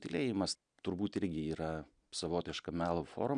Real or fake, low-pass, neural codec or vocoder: real; 9.9 kHz; none